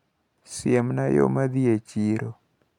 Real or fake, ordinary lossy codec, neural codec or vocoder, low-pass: real; none; none; 19.8 kHz